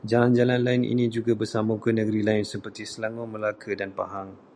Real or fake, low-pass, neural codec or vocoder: real; 9.9 kHz; none